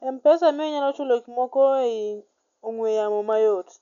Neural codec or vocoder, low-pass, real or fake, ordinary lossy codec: none; 7.2 kHz; real; none